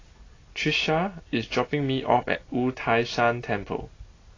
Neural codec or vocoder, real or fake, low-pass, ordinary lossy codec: none; real; 7.2 kHz; AAC, 32 kbps